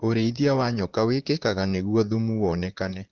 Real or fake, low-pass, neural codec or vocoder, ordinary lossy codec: fake; 7.2 kHz; vocoder, 44.1 kHz, 128 mel bands, Pupu-Vocoder; Opus, 16 kbps